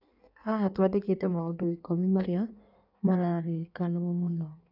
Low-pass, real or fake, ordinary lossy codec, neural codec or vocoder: 5.4 kHz; fake; none; codec, 16 kHz in and 24 kHz out, 1.1 kbps, FireRedTTS-2 codec